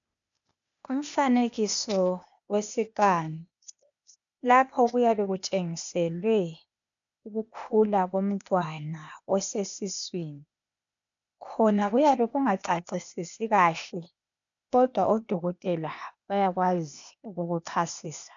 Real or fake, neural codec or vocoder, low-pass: fake; codec, 16 kHz, 0.8 kbps, ZipCodec; 7.2 kHz